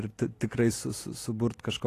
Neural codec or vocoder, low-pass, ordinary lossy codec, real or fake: none; 14.4 kHz; AAC, 48 kbps; real